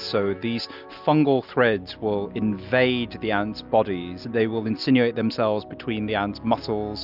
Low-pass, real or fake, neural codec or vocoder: 5.4 kHz; real; none